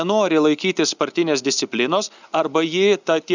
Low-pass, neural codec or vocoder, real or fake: 7.2 kHz; none; real